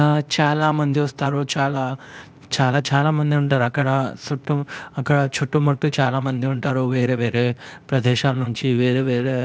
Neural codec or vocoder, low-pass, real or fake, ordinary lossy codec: codec, 16 kHz, 0.8 kbps, ZipCodec; none; fake; none